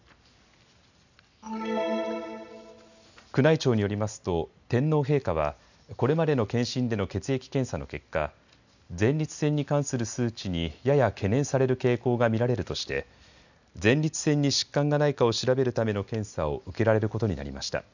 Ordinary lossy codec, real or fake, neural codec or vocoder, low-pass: none; real; none; 7.2 kHz